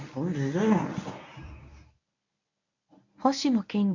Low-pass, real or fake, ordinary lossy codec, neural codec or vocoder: 7.2 kHz; fake; none; codec, 24 kHz, 0.9 kbps, WavTokenizer, medium speech release version 1